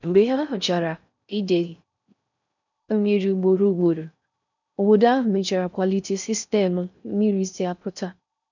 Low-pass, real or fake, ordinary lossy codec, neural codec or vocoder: 7.2 kHz; fake; none; codec, 16 kHz in and 24 kHz out, 0.6 kbps, FocalCodec, streaming, 4096 codes